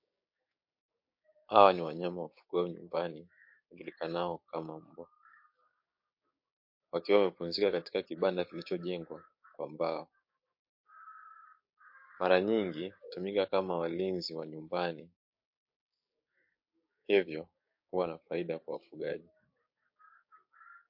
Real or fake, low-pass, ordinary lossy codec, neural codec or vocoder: fake; 5.4 kHz; MP3, 32 kbps; codec, 16 kHz, 6 kbps, DAC